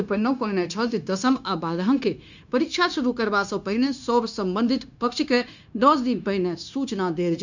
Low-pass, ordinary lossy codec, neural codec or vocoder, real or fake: 7.2 kHz; none; codec, 16 kHz, 0.9 kbps, LongCat-Audio-Codec; fake